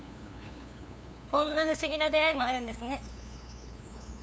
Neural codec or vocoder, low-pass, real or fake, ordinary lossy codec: codec, 16 kHz, 2 kbps, FunCodec, trained on LibriTTS, 25 frames a second; none; fake; none